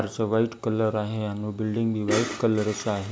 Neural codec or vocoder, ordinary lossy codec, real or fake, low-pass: none; none; real; none